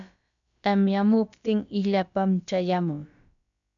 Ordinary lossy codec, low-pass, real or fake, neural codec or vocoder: MP3, 96 kbps; 7.2 kHz; fake; codec, 16 kHz, about 1 kbps, DyCAST, with the encoder's durations